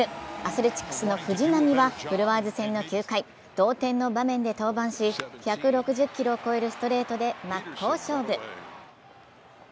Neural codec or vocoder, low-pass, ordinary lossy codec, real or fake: none; none; none; real